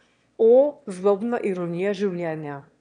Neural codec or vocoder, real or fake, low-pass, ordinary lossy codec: autoencoder, 22.05 kHz, a latent of 192 numbers a frame, VITS, trained on one speaker; fake; 9.9 kHz; none